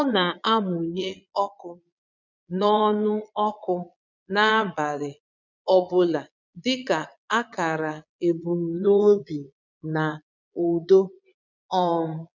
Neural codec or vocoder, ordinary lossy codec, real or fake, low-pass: vocoder, 22.05 kHz, 80 mel bands, Vocos; none; fake; 7.2 kHz